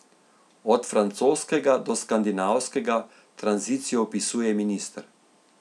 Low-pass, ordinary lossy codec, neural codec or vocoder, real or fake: none; none; none; real